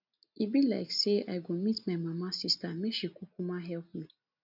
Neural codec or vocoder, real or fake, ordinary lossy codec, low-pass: none; real; none; 5.4 kHz